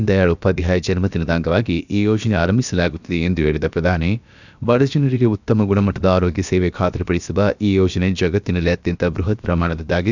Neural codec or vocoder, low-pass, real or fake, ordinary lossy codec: codec, 16 kHz, about 1 kbps, DyCAST, with the encoder's durations; 7.2 kHz; fake; none